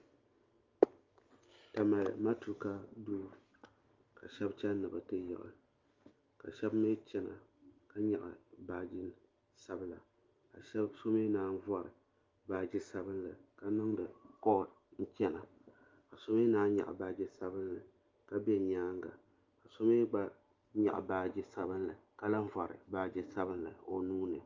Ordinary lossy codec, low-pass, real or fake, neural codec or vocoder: Opus, 32 kbps; 7.2 kHz; real; none